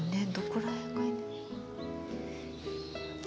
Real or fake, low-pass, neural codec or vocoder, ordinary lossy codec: real; none; none; none